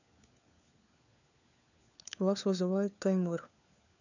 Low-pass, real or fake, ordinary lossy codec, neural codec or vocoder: 7.2 kHz; fake; none; codec, 16 kHz, 4 kbps, FunCodec, trained on LibriTTS, 50 frames a second